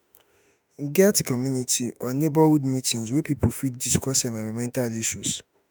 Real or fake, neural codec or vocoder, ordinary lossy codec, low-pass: fake; autoencoder, 48 kHz, 32 numbers a frame, DAC-VAE, trained on Japanese speech; none; none